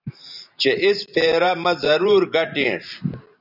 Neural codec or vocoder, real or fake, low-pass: vocoder, 44.1 kHz, 128 mel bands every 512 samples, BigVGAN v2; fake; 5.4 kHz